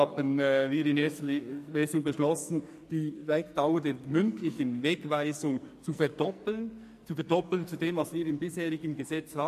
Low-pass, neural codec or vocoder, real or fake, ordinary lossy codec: 14.4 kHz; codec, 44.1 kHz, 2.6 kbps, SNAC; fake; MP3, 64 kbps